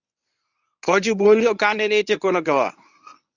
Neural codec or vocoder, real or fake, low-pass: codec, 24 kHz, 0.9 kbps, WavTokenizer, medium speech release version 1; fake; 7.2 kHz